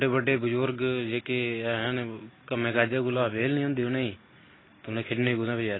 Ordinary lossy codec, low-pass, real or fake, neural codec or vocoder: AAC, 16 kbps; 7.2 kHz; real; none